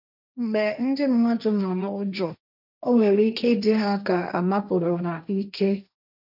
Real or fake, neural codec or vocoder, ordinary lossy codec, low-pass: fake; codec, 16 kHz, 1.1 kbps, Voila-Tokenizer; none; 5.4 kHz